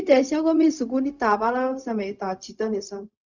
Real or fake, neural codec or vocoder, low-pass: fake; codec, 16 kHz, 0.4 kbps, LongCat-Audio-Codec; 7.2 kHz